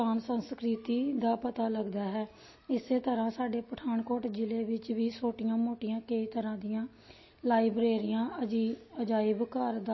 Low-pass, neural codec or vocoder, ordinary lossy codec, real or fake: 7.2 kHz; none; MP3, 24 kbps; real